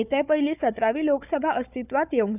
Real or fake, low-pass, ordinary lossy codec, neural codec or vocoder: fake; 3.6 kHz; none; codec, 16 kHz, 4 kbps, FunCodec, trained on Chinese and English, 50 frames a second